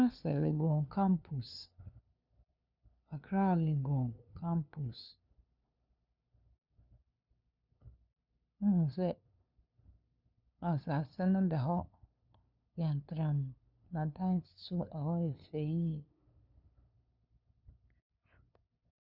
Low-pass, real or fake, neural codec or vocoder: 5.4 kHz; fake; codec, 16 kHz, 2 kbps, FunCodec, trained on LibriTTS, 25 frames a second